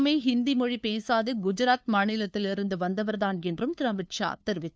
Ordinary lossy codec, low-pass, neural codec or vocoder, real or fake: none; none; codec, 16 kHz, 2 kbps, FunCodec, trained on LibriTTS, 25 frames a second; fake